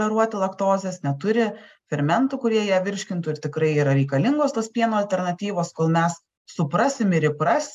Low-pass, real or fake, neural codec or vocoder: 14.4 kHz; real; none